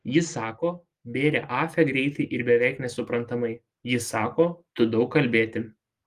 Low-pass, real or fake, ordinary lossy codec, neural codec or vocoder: 14.4 kHz; real; Opus, 24 kbps; none